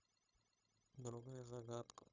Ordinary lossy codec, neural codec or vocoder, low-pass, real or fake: none; codec, 16 kHz, 0.9 kbps, LongCat-Audio-Codec; 7.2 kHz; fake